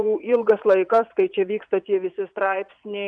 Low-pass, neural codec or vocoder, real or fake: 9.9 kHz; vocoder, 22.05 kHz, 80 mel bands, Vocos; fake